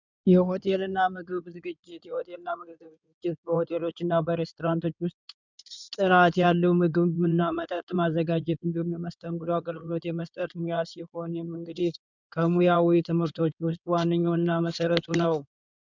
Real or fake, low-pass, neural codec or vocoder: fake; 7.2 kHz; codec, 16 kHz in and 24 kHz out, 2.2 kbps, FireRedTTS-2 codec